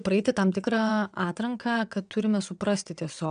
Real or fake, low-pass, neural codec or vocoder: fake; 9.9 kHz; vocoder, 22.05 kHz, 80 mel bands, WaveNeXt